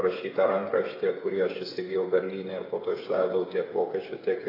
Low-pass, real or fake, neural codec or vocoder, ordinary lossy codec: 5.4 kHz; fake; codec, 16 kHz, 8 kbps, FreqCodec, smaller model; AAC, 24 kbps